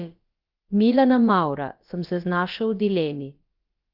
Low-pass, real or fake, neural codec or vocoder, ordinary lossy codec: 5.4 kHz; fake; codec, 16 kHz, about 1 kbps, DyCAST, with the encoder's durations; Opus, 32 kbps